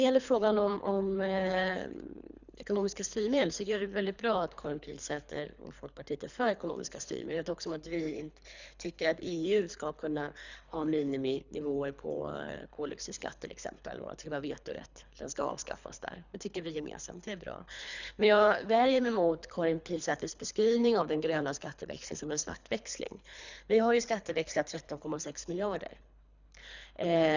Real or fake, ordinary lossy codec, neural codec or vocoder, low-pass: fake; none; codec, 24 kHz, 3 kbps, HILCodec; 7.2 kHz